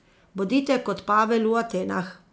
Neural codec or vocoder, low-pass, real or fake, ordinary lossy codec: none; none; real; none